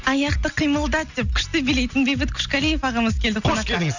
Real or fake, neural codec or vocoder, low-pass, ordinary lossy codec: real; none; 7.2 kHz; none